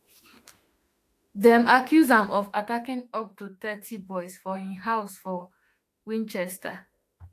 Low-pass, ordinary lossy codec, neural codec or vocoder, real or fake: 14.4 kHz; AAC, 64 kbps; autoencoder, 48 kHz, 32 numbers a frame, DAC-VAE, trained on Japanese speech; fake